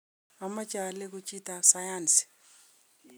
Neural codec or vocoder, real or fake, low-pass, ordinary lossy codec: none; real; none; none